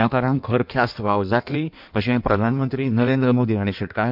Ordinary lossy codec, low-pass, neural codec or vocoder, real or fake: MP3, 48 kbps; 5.4 kHz; codec, 16 kHz in and 24 kHz out, 1.1 kbps, FireRedTTS-2 codec; fake